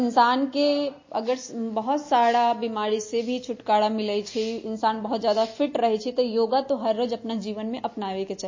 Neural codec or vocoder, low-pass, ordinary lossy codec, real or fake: none; 7.2 kHz; MP3, 32 kbps; real